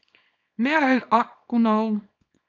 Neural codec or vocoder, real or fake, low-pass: codec, 24 kHz, 0.9 kbps, WavTokenizer, small release; fake; 7.2 kHz